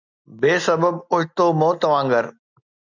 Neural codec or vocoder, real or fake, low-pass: none; real; 7.2 kHz